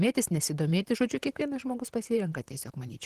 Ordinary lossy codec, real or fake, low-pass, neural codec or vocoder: Opus, 16 kbps; fake; 14.4 kHz; vocoder, 44.1 kHz, 128 mel bands, Pupu-Vocoder